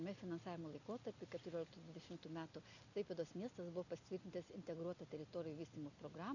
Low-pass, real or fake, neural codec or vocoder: 7.2 kHz; real; none